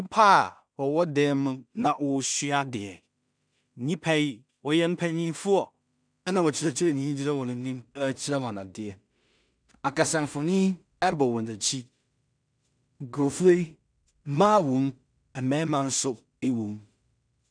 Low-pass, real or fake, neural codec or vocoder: 9.9 kHz; fake; codec, 16 kHz in and 24 kHz out, 0.4 kbps, LongCat-Audio-Codec, two codebook decoder